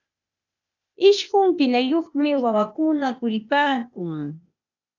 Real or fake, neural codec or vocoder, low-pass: fake; codec, 16 kHz, 0.8 kbps, ZipCodec; 7.2 kHz